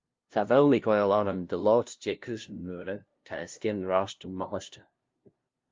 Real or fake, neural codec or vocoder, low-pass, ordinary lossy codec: fake; codec, 16 kHz, 0.5 kbps, FunCodec, trained on LibriTTS, 25 frames a second; 7.2 kHz; Opus, 32 kbps